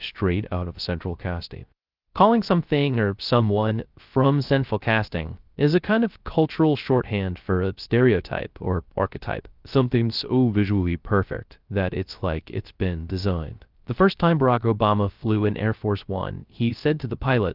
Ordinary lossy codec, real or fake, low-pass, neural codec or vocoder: Opus, 32 kbps; fake; 5.4 kHz; codec, 16 kHz, 0.3 kbps, FocalCodec